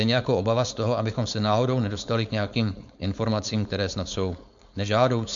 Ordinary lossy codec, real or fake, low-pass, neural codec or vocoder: MP3, 64 kbps; fake; 7.2 kHz; codec, 16 kHz, 4.8 kbps, FACodec